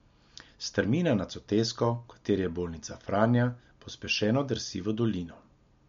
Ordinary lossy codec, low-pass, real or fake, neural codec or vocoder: MP3, 48 kbps; 7.2 kHz; real; none